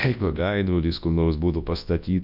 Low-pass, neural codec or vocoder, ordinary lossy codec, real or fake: 5.4 kHz; codec, 24 kHz, 0.9 kbps, WavTokenizer, large speech release; MP3, 48 kbps; fake